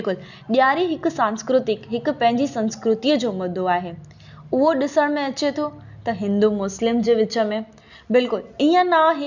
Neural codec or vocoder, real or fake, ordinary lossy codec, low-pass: none; real; none; 7.2 kHz